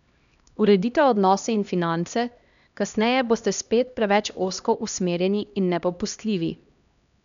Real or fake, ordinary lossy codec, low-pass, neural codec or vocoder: fake; none; 7.2 kHz; codec, 16 kHz, 1 kbps, X-Codec, HuBERT features, trained on LibriSpeech